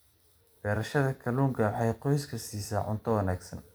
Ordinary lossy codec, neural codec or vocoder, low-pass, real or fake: none; vocoder, 44.1 kHz, 128 mel bands every 256 samples, BigVGAN v2; none; fake